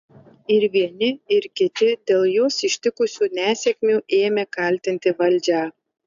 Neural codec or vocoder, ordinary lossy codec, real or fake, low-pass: none; AAC, 96 kbps; real; 7.2 kHz